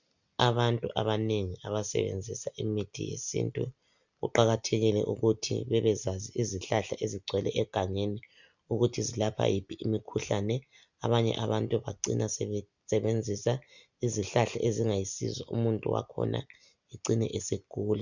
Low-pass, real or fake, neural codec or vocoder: 7.2 kHz; real; none